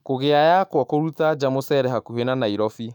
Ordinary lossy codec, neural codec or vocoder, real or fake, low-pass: none; autoencoder, 48 kHz, 128 numbers a frame, DAC-VAE, trained on Japanese speech; fake; 19.8 kHz